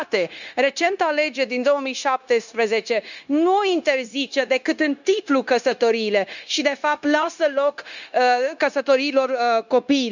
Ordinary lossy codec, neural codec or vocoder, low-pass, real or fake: none; codec, 16 kHz, 0.9 kbps, LongCat-Audio-Codec; 7.2 kHz; fake